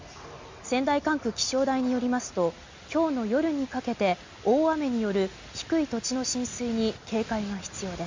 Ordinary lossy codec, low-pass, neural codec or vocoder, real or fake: MP3, 48 kbps; 7.2 kHz; none; real